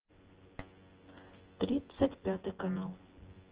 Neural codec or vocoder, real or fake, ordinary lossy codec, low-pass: vocoder, 24 kHz, 100 mel bands, Vocos; fake; Opus, 16 kbps; 3.6 kHz